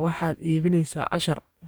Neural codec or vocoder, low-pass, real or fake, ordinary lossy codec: codec, 44.1 kHz, 2.6 kbps, DAC; none; fake; none